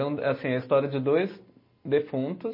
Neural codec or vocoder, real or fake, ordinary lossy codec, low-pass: none; real; MP3, 24 kbps; 5.4 kHz